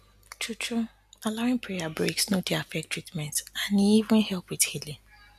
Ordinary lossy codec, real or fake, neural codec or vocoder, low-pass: none; real; none; 14.4 kHz